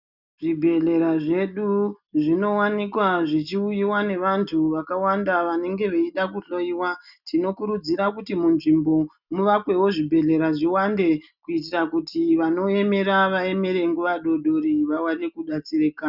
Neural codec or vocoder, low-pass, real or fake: none; 5.4 kHz; real